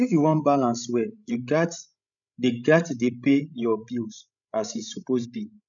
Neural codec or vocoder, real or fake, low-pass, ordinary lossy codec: codec, 16 kHz, 16 kbps, FreqCodec, larger model; fake; 7.2 kHz; none